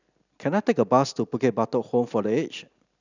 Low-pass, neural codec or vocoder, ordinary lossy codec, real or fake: 7.2 kHz; none; none; real